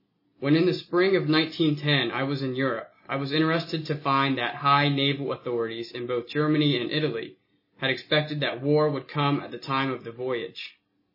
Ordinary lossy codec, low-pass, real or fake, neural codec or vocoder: MP3, 24 kbps; 5.4 kHz; real; none